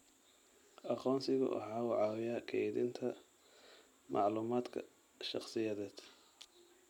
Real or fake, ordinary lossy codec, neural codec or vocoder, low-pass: real; none; none; 19.8 kHz